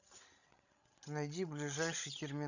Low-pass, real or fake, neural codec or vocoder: 7.2 kHz; fake; codec, 16 kHz, 16 kbps, FreqCodec, larger model